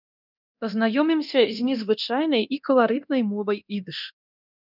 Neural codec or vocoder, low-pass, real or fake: codec, 24 kHz, 0.9 kbps, DualCodec; 5.4 kHz; fake